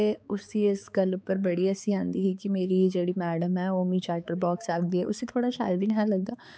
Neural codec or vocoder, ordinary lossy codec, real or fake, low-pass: codec, 16 kHz, 4 kbps, X-Codec, HuBERT features, trained on balanced general audio; none; fake; none